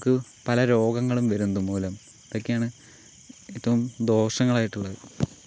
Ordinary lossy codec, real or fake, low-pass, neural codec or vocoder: none; real; none; none